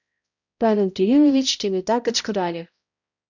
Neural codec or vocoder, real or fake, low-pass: codec, 16 kHz, 0.5 kbps, X-Codec, HuBERT features, trained on balanced general audio; fake; 7.2 kHz